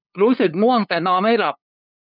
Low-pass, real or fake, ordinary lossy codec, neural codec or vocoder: 5.4 kHz; fake; none; codec, 16 kHz, 8 kbps, FunCodec, trained on LibriTTS, 25 frames a second